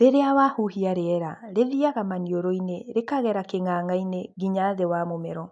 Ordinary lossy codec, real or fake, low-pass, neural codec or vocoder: none; real; 10.8 kHz; none